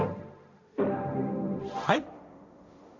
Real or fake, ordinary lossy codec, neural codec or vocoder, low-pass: fake; none; codec, 16 kHz, 1.1 kbps, Voila-Tokenizer; 7.2 kHz